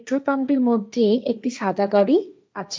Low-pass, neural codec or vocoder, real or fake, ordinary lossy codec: none; codec, 16 kHz, 1.1 kbps, Voila-Tokenizer; fake; none